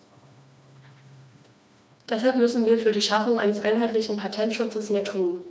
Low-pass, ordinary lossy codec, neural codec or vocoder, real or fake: none; none; codec, 16 kHz, 2 kbps, FreqCodec, smaller model; fake